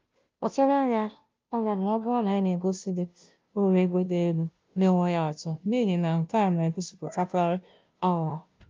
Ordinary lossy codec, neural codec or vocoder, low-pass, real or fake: Opus, 24 kbps; codec, 16 kHz, 0.5 kbps, FunCodec, trained on Chinese and English, 25 frames a second; 7.2 kHz; fake